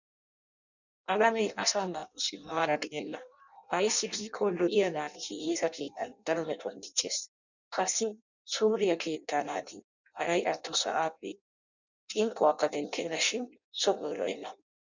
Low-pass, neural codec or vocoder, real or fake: 7.2 kHz; codec, 16 kHz in and 24 kHz out, 0.6 kbps, FireRedTTS-2 codec; fake